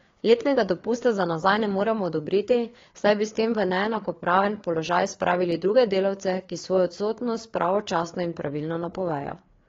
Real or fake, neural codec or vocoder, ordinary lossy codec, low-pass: fake; codec, 16 kHz, 4 kbps, FreqCodec, larger model; AAC, 32 kbps; 7.2 kHz